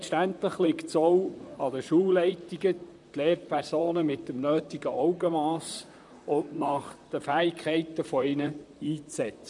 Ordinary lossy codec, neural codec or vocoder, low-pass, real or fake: none; vocoder, 44.1 kHz, 128 mel bands, Pupu-Vocoder; 10.8 kHz; fake